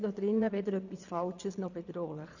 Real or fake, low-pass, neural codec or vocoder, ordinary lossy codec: fake; 7.2 kHz; vocoder, 22.05 kHz, 80 mel bands, Vocos; none